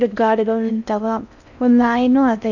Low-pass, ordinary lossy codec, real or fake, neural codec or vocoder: 7.2 kHz; none; fake; codec, 16 kHz in and 24 kHz out, 0.6 kbps, FocalCodec, streaming, 4096 codes